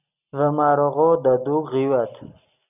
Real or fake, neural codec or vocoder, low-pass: real; none; 3.6 kHz